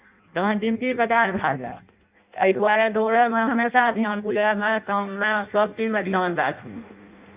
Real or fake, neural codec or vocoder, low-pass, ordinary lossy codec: fake; codec, 16 kHz in and 24 kHz out, 0.6 kbps, FireRedTTS-2 codec; 3.6 kHz; Opus, 64 kbps